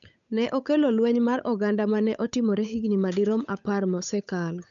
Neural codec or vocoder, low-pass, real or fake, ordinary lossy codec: codec, 16 kHz, 8 kbps, FunCodec, trained on Chinese and English, 25 frames a second; 7.2 kHz; fake; none